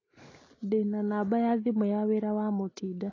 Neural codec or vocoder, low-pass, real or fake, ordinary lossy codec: none; 7.2 kHz; real; AAC, 32 kbps